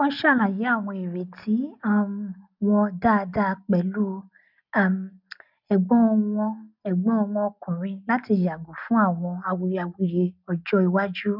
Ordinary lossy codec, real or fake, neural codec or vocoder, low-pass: none; real; none; 5.4 kHz